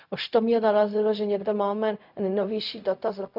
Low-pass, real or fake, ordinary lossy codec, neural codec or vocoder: 5.4 kHz; fake; none; codec, 16 kHz, 0.4 kbps, LongCat-Audio-Codec